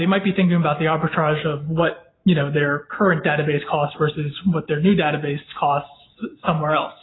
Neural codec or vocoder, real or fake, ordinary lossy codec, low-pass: none; real; AAC, 16 kbps; 7.2 kHz